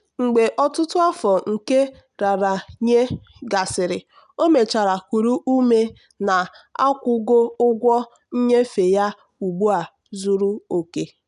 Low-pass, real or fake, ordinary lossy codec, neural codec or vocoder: 10.8 kHz; real; none; none